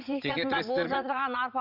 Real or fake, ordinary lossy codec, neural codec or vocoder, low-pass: fake; none; vocoder, 44.1 kHz, 80 mel bands, Vocos; 5.4 kHz